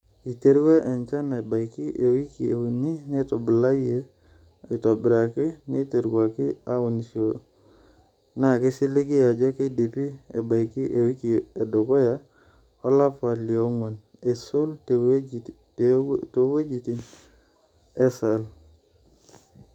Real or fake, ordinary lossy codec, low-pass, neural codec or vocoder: fake; none; 19.8 kHz; codec, 44.1 kHz, 7.8 kbps, DAC